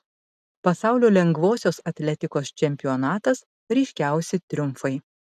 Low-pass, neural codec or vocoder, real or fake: 14.4 kHz; none; real